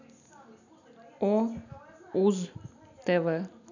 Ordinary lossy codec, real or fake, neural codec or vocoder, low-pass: none; real; none; 7.2 kHz